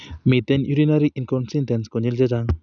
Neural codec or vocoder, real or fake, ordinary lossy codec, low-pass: none; real; none; 7.2 kHz